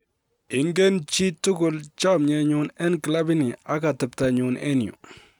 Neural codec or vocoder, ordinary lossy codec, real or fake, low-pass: none; none; real; 19.8 kHz